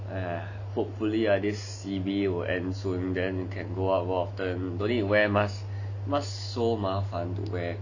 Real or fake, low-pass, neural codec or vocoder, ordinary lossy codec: real; 7.2 kHz; none; none